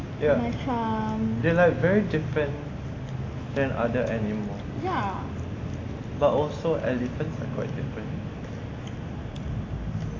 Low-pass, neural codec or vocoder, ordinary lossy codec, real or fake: 7.2 kHz; none; AAC, 32 kbps; real